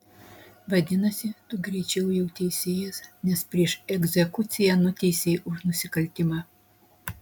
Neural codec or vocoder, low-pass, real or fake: none; 19.8 kHz; real